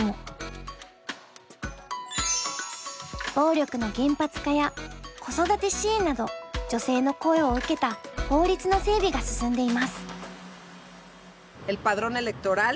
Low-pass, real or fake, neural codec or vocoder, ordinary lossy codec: none; real; none; none